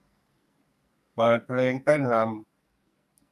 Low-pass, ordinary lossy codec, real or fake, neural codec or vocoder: 14.4 kHz; none; fake; codec, 44.1 kHz, 2.6 kbps, SNAC